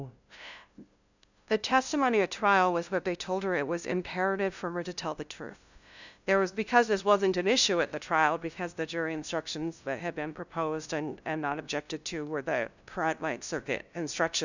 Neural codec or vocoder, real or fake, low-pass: codec, 16 kHz, 0.5 kbps, FunCodec, trained on LibriTTS, 25 frames a second; fake; 7.2 kHz